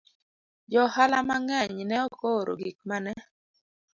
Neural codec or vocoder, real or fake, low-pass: none; real; 7.2 kHz